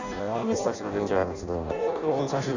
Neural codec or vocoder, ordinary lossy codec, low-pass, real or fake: codec, 16 kHz in and 24 kHz out, 0.6 kbps, FireRedTTS-2 codec; none; 7.2 kHz; fake